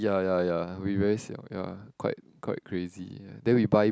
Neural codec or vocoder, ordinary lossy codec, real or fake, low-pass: none; none; real; none